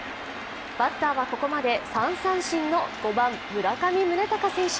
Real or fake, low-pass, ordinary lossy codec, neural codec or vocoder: real; none; none; none